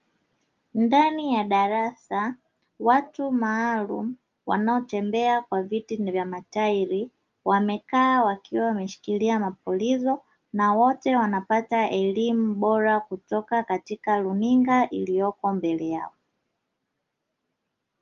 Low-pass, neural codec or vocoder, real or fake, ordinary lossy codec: 7.2 kHz; none; real; Opus, 32 kbps